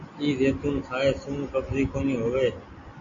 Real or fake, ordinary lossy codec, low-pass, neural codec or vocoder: real; Opus, 64 kbps; 7.2 kHz; none